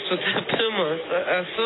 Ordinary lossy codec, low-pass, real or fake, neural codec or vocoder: AAC, 16 kbps; 7.2 kHz; real; none